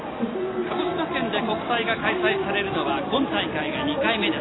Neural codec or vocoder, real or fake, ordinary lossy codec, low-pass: none; real; AAC, 16 kbps; 7.2 kHz